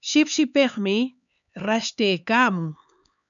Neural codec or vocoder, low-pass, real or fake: codec, 16 kHz, 4 kbps, X-Codec, HuBERT features, trained on LibriSpeech; 7.2 kHz; fake